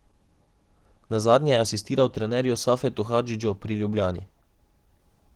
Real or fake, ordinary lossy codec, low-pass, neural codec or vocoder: fake; Opus, 16 kbps; 19.8 kHz; codec, 44.1 kHz, 7.8 kbps, DAC